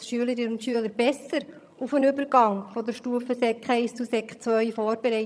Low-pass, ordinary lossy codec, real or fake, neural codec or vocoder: none; none; fake; vocoder, 22.05 kHz, 80 mel bands, HiFi-GAN